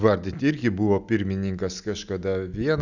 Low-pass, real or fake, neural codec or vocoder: 7.2 kHz; real; none